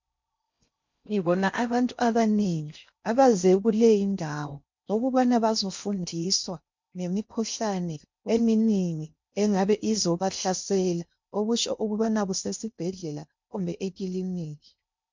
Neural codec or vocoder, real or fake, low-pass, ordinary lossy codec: codec, 16 kHz in and 24 kHz out, 0.6 kbps, FocalCodec, streaming, 4096 codes; fake; 7.2 kHz; MP3, 64 kbps